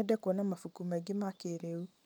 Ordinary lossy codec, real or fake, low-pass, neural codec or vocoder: none; real; none; none